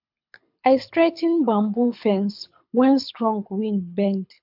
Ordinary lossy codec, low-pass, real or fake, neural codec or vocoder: MP3, 48 kbps; 5.4 kHz; fake; codec, 24 kHz, 6 kbps, HILCodec